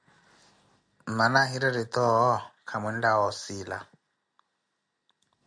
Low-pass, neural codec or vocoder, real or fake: 9.9 kHz; none; real